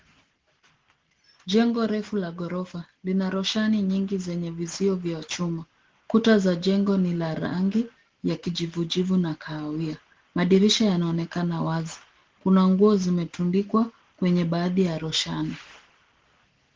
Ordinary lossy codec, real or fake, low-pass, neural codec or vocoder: Opus, 16 kbps; real; 7.2 kHz; none